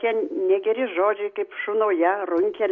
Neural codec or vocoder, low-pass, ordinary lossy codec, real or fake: none; 7.2 kHz; AAC, 64 kbps; real